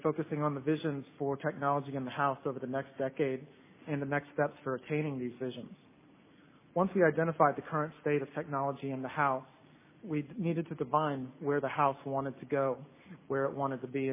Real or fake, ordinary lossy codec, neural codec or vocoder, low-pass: real; MP3, 16 kbps; none; 3.6 kHz